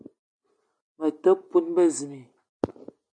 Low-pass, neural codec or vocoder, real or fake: 9.9 kHz; none; real